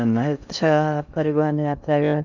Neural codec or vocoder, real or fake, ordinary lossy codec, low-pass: codec, 16 kHz in and 24 kHz out, 0.6 kbps, FocalCodec, streaming, 2048 codes; fake; none; 7.2 kHz